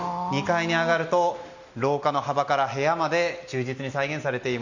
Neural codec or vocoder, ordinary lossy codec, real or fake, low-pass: none; none; real; 7.2 kHz